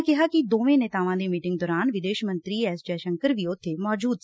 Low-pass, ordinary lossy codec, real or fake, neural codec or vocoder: none; none; real; none